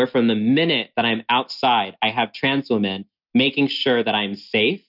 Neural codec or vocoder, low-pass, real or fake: none; 5.4 kHz; real